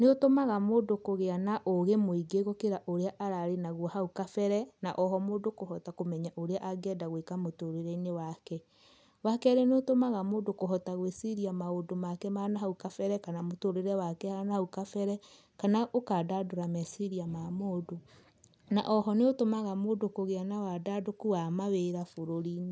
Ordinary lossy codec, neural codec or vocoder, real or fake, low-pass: none; none; real; none